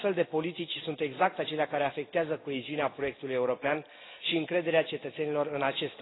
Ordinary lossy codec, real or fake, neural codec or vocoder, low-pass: AAC, 16 kbps; real; none; 7.2 kHz